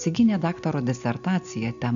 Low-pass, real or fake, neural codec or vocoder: 7.2 kHz; real; none